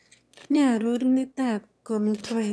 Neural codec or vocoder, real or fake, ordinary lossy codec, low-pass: autoencoder, 22.05 kHz, a latent of 192 numbers a frame, VITS, trained on one speaker; fake; none; none